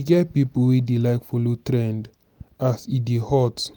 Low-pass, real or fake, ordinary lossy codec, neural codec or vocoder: none; real; none; none